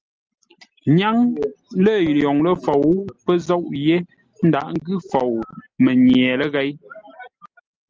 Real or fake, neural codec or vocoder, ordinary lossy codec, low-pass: real; none; Opus, 24 kbps; 7.2 kHz